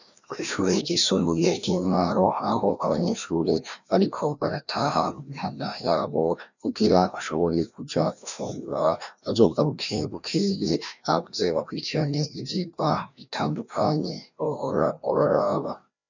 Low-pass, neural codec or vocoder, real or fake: 7.2 kHz; codec, 16 kHz, 1 kbps, FreqCodec, larger model; fake